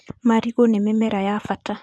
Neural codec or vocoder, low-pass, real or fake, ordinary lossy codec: vocoder, 24 kHz, 100 mel bands, Vocos; none; fake; none